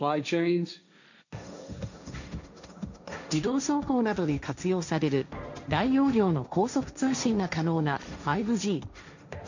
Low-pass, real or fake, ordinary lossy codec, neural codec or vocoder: 7.2 kHz; fake; none; codec, 16 kHz, 1.1 kbps, Voila-Tokenizer